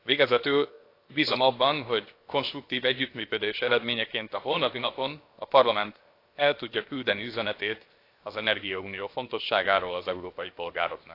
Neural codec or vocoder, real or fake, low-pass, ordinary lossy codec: codec, 16 kHz, 0.7 kbps, FocalCodec; fake; 5.4 kHz; AAC, 32 kbps